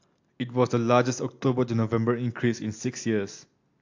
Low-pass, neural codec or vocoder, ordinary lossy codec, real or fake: 7.2 kHz; none; AAC, 48 kbps; real